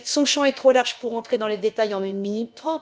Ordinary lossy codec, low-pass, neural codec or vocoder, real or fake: none; none; codec, 16 kHz, about 1 kbps, DyCAST, with the encoder's durations; fake